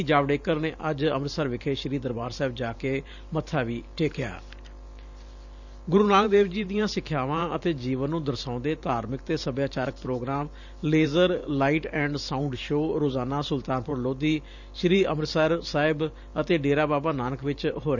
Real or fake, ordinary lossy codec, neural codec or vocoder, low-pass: fake; none; vocoder, 44.1 kHz, 128 mel bands every 512 samples, BigVGAN v2; 7.2 kHz